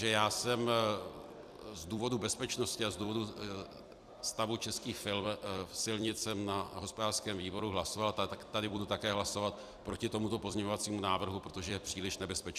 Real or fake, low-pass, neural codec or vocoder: fake; 14.4 kHz; vocoder, 44.1 kHz, 128 mel bands every 256 samples, BigVGAN v2